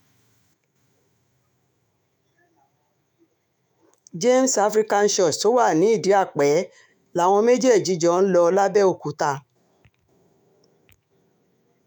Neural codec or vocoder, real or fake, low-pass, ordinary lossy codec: autoencoder, 48 kHz, 128 numbers a frame, DAC-VAE, trained on Japanese speech; fake; 19.8 kHz; none